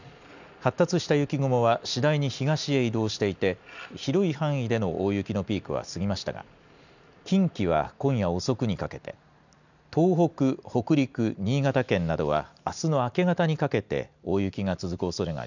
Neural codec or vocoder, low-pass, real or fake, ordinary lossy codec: none; 7.2 kHz; real; none